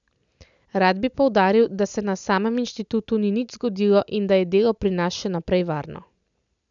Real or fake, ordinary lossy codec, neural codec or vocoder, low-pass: real; none; none; 7.2 kHz